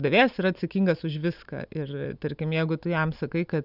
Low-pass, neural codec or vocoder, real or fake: 5.4 kHz; none; real